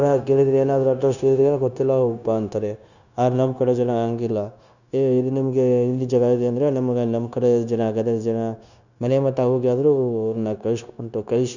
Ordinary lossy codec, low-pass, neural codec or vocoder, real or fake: none; 7.2 kHz; codec, 16 kHz, 0.9 kbps, LongCat-Audio-Codec; fake